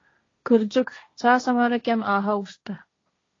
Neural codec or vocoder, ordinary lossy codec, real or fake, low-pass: codec, 16 kHz, 1.1 kbps, Voila-Tokenizer; AAC, 32 kbps; fake; 7.2 kHz